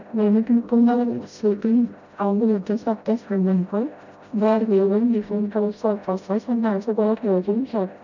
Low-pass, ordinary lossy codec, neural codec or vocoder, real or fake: 7.2 kHz; none; codec, 16 kHz, 0.5 kbps, FreqCodec, smaller model; fake